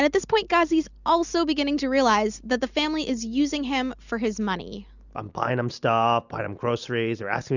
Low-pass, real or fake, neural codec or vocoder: 7.2 kHz; real; none